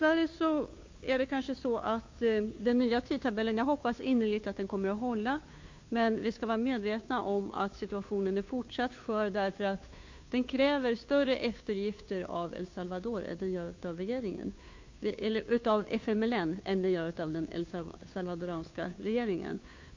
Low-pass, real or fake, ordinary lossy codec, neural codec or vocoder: 7.2 kHz; fake; MP3, 48 kbps; codec, 16 kHz, 2 kbps, FunCodec, trained on Chinese and English, 25 frames a second